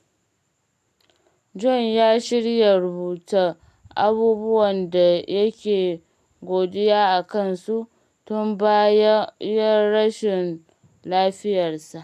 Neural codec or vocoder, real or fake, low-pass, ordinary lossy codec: none; real; 14.4 kHz; none